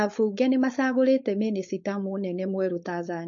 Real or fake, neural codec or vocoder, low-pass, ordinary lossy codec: fake; codec, 16 kHz, 4.8 kbps, FACodec; 7.2 kHz; MP3, 32 kbps